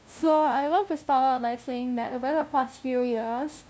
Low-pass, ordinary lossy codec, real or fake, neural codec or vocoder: none; none; fake; codec, 16 kHz, 0.5 kbps, FunCodec, trained on LibriTTS, 25 frames a second